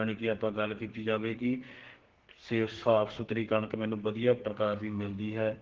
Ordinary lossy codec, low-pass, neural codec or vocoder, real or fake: Opus, 16 kbps; 7.2 kHz; codec, 32 kHz, 1.9 kbps, SNAC; fake